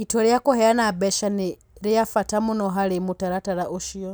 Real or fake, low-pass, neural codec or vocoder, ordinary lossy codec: real; none; none; none